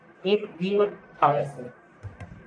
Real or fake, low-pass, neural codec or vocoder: fake; 9.9 kHz; codec, 44.1 kHz, 1.7 kbps, Pupu-Codec